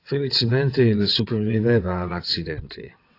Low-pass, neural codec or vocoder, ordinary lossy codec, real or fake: 5.4 kHz; vocoder, 22.05 kHz, 80 mel bands, WaveNeXt; AAC, 32 kbps; fake